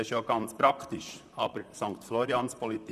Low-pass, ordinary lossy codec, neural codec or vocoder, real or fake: 14.4 kHz; none; vocoder, 44.1 kHz, 128 mel bands, Pupu-Vocoder; fake